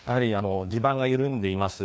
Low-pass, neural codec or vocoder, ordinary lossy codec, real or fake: none; codec, 16 kHz, 2 kbps, FreqCodec, larger model; none; fake